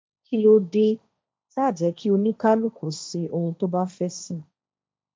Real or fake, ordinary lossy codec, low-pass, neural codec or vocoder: fake; none; none; codec, 16 kHz, 1.1 kbps, Voila-Tokenizer